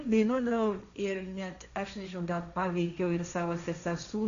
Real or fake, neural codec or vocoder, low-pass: fake; codec, 16 kHz, 1.1 kbps, Voila-Tokenizer; 7.2 kHz